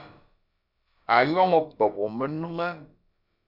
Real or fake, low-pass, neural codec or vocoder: fake; 5.4 kHz; codec, 16 kHz, about 1 kbps, DyCAST, with the encoder's durations